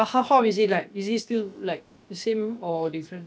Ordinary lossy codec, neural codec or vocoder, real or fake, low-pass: none; codec, 16 kHz, about 1 kbps, DyCAST, with the encoder's durations; fake; none